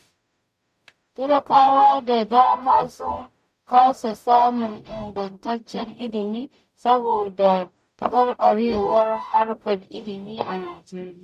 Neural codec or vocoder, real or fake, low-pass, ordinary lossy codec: codec, 44.1 kHz, 0.9 kbps, DAC; fake; 14.4 kHz; none